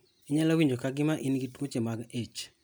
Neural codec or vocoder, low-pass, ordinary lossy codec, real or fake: none; none; none; real